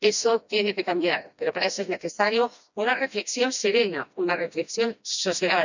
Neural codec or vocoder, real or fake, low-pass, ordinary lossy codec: codec, 16 kHz, 1 kbps, FreqCodec, smaller model; fake; 7.2 kHz; none